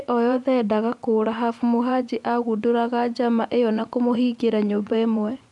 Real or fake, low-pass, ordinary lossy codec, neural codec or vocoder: fake; 10.8 kHz; none; vocoder, 24 kHz, 100 mel bands, Vocos